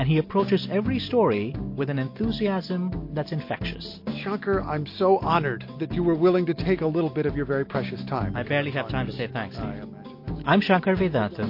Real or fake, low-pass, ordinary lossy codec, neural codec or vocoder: real; 5.4 kHz; MP3, 32 kbps; none